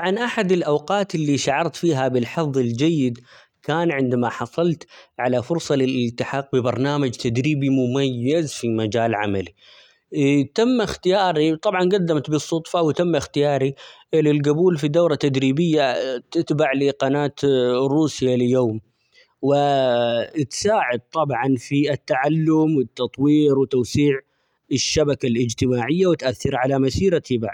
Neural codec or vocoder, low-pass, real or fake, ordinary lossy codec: none; 19.8 kHz; real; none